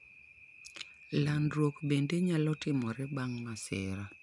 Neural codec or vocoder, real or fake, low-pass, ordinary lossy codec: none; real; 10.8 kHz; none